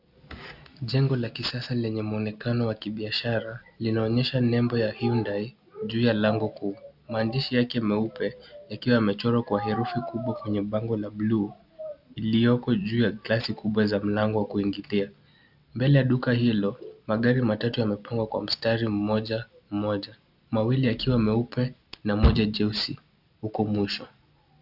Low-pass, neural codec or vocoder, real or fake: 5.4 kHz; none; real